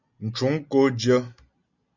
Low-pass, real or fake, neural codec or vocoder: 7.2 kHz; real; none